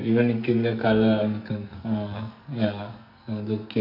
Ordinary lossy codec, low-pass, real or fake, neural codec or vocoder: MP3, 48 kbps; 5.4 kHz; fake; codec, 44.1 kHz, 2.6 kbps, SNAC